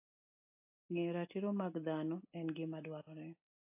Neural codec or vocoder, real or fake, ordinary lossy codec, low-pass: none; real; AAC, 24 kbps; 3.6 kHz